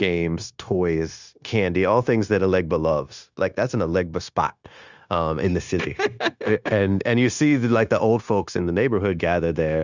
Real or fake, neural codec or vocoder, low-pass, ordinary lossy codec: fake; codec, 16 kHz, 0.9 kbps, LongCat-Audio-Codec; 7.2 kHz; Opus, 64 kbps